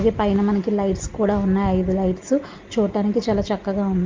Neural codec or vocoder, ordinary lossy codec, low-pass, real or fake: none; Opus, 24 kbps; 7.2 kHz; real